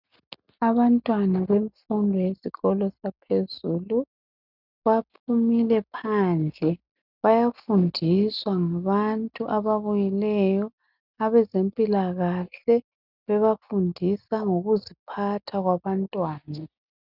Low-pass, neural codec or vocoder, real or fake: 5.4 kHz; none; real